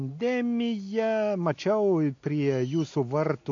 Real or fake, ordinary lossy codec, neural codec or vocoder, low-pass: real; AAC, 48 kbps; none; 7.2 kHz